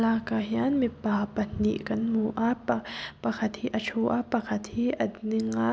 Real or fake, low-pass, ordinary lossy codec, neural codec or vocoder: real; none; none; none